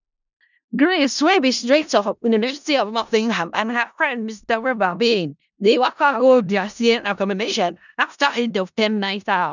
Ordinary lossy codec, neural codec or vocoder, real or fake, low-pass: none; codec, 16 kHz in and 24 kHz out, 0.4 kbps, LongCat-Audio-Codec, four codebook decoder; fake; 7.2 kHz